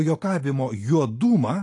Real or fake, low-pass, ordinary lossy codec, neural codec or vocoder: real; 10.8 kHz; AAC, 48 kbps; none